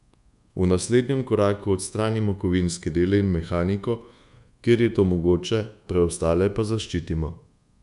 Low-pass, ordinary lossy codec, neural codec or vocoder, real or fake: 10.8 kHz; none; codec, 24 kHz, 1.2 kbps, DualCodec; fake